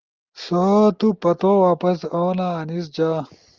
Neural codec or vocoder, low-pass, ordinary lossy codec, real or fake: none; 7.2 kHz; Opus, 32 kbps; real